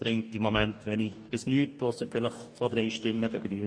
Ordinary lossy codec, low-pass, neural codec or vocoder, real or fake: MP3, 48 kbps; 9.9 kHz; codec, 44.1 kHz, 2.6 kbps, DAC; fake